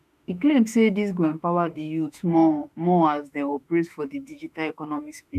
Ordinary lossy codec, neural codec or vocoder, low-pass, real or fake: none; autoencoder, 48 kHz, 32 numbers a frame, DAC-VAE, trained on Japanese speech; 14.4 kHz; fake